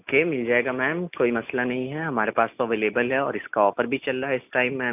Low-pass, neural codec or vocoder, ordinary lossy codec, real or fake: 3.6 kHz; none; none; real